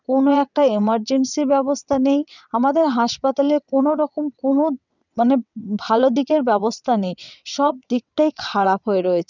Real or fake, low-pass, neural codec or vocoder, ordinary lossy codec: fake; 7.2 kHz; vocoder, 22.05 kHz, 80 mel bands, WaveNeXt; none